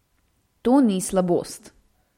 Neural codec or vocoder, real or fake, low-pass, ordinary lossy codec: none; real; 19.8 kHz; MP3, 64 kbps